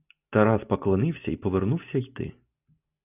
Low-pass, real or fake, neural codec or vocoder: 3.6 kHz; real; none